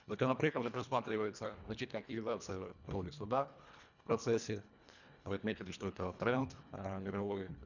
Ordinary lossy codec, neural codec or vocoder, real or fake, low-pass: none; codec, 24 kHz, 1.5 kbps, HILCodec; fake; 7.2 kHz